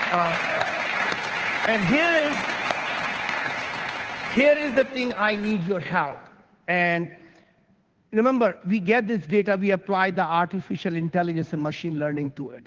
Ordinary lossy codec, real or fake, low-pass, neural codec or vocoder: Opus, 16 kbps; fake; 7.2 kHz; codec, 16 kHz, 2 kbps, FunCodec, trained on Chinese and English, 25 frames a second